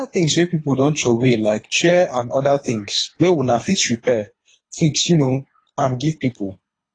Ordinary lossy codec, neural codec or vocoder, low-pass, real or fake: AAC, 32 kbps; codec, 24 kHz, 3 kbps, HILCodec; 9.9 kHz; fake